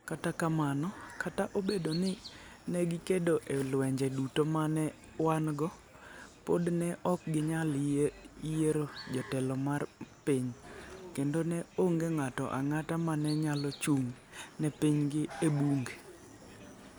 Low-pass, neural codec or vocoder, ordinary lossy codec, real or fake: none; none; none; real